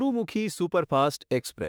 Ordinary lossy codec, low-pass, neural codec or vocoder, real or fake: none; none; autoencoder, 48 kHz, 32 numbers a frame, DAC-VAE, trained on Japanese speech; fake